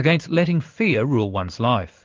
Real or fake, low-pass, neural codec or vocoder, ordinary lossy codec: fake; 7.2 kHz; codec, 16 kHz in and 24 kHz out, 1 kbps, XY-Tokenizer; Opus, 32 kbps